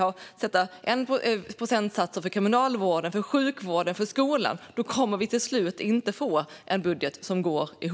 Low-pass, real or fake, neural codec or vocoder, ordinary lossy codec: none; real; none; none